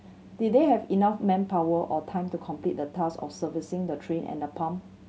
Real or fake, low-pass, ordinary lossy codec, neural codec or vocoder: real; none; none; none